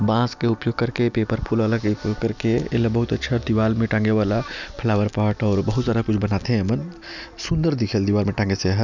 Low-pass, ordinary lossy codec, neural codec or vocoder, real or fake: 7.2 kHz; none; none; real